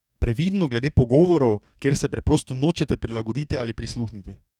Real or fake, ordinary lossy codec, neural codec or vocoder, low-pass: fake; none; codec, 44.1 kHz, 2.6 kbps, DAC; 19.8 kHz